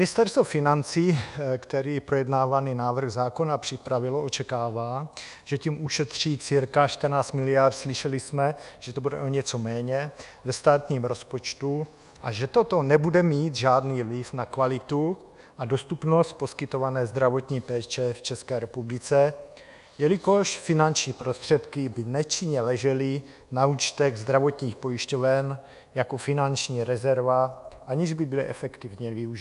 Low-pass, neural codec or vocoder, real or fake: 10.8 kHz; codec, 24 kHz, 1.2 kbps, DualCodec; fake